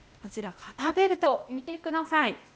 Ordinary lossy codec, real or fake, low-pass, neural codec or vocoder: none; fake; none; codec, 16 kHz, 0.8 kbps, ZipCodec